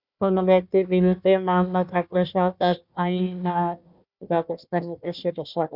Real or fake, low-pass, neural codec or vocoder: fake; 5.4 kHz; codec, 16 kHz, 1 kbps, FunCodec, trained on Chinese and English, 50 frames a second